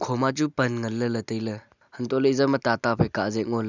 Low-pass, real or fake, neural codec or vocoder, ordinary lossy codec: 7.2 kHz; real; none; none